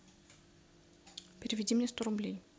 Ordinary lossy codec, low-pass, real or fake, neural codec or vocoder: none; none; real; none